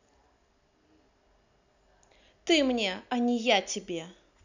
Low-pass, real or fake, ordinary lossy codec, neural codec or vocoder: 7.2 kHz; real; none; none